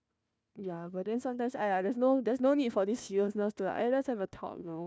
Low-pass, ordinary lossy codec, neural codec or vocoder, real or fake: none; none; codec, 16 kHz, 1 kbps, FunCodec, trained on Chinese and English, 50 frames a second; fake